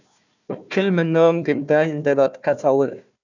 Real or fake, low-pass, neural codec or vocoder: fake; 7.2 kHz; codec, 16 kHz, 1 kbps, FunCodec, trained on Chinese and English, 50 frames a second